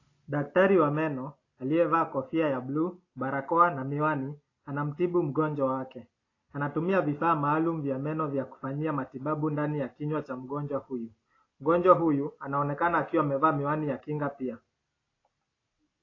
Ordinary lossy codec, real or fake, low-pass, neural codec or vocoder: AAC, 32 kbps; real; 7.2 kHz; none